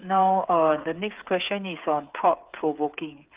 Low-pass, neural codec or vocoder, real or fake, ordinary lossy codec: 3.6 kHz; codec, 16 kHz, 8 kbps, FreqCodec, smaller model; fake; Opus, 32 kbps